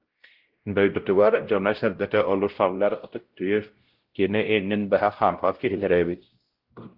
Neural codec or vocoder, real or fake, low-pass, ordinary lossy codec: codec, 16 kHz, 0.5 kbps, X-Codec, WavLM features, trained on Multilingual LibriSpeech; fake; 5.4 kHz; Opus, 16 kbps